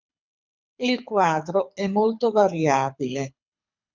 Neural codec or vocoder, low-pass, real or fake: codec, 24 kHz, 6 kbps, HILCodec; 7.2 kHz; fake